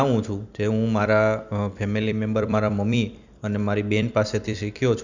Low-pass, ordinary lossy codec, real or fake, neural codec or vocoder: 7.2 kHz; none; fake; vocoder, 44.1 kHz, 128 mel bands every 256 samples, BigVGAN v2